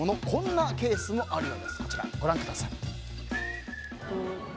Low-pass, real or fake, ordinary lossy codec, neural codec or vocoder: none; real; none; none